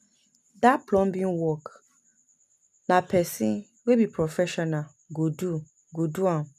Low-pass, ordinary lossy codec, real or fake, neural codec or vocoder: 14.4 kHz; none; real; none